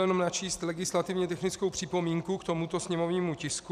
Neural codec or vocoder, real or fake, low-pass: vocoder, 44.1 kHz, 128 mel bands every 256 samples, BigVGAN v2; fake; 14.4 kHz